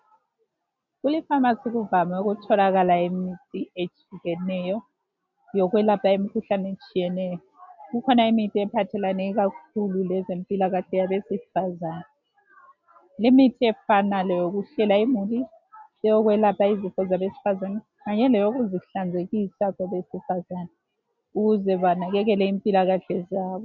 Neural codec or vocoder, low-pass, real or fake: none; 7.2 kHz; real